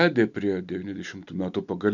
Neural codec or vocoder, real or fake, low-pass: none; real; 7.2 kHz